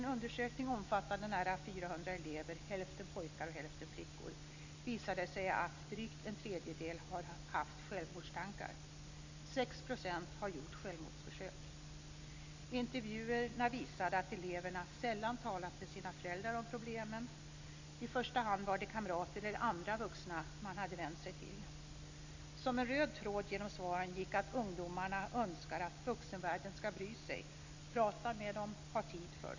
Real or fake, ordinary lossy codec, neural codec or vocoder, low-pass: real; AAC, 48 kbps; none; 7.2 kHz